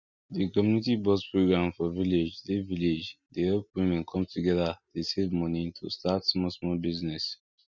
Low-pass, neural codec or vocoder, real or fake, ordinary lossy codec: 7.2 kHz; none; real; none